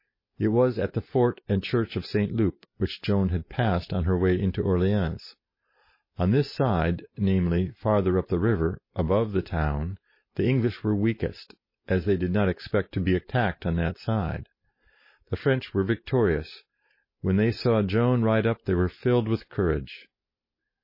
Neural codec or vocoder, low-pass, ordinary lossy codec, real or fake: none; 5.4 kHz; MP3, 24 kbps; real